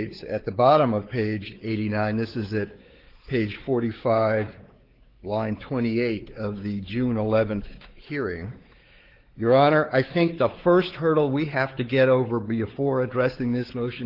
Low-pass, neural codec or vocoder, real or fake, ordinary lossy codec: 5.4 kHz; codec, 16 kHz, 4 kbps, X-Codec, WavLM features, trained on Multilingual LibriSpeech; fake; Opus, 16 kbps